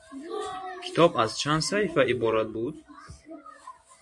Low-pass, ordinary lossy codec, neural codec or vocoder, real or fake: 10.8 kHz; MP3, 48 kbps; vocoder, 24 kHz, 100 mel bands, Vocos; fake